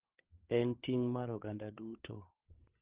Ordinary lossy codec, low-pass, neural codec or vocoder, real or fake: Opus, 16 kbps; 3.6 kHz; codec, 16 kHz, 8 kbps, FreqCodec, larger model; fake